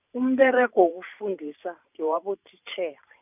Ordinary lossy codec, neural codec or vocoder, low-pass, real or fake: none; vocoder, 44.1 kHz, 128 mel bands every 512 samples, BigVGAN v2; 3.6 kHz; fake